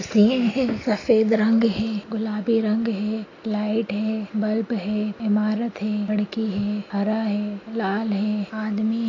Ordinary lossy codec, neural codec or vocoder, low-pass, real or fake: AAC, 32 kbps; none; 7.2 kHz; real